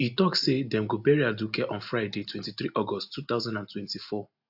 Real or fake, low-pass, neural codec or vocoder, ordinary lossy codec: real; 5.4 kHz; none; AAC, 48 kbps